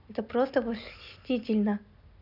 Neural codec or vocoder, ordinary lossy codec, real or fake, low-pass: none; none; real; 5.4 kHz